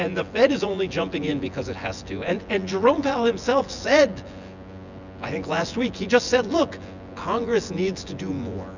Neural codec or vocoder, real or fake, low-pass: vocoder, 24 kHz, 100 mel bands, Vocos; fake; 7.2 kHz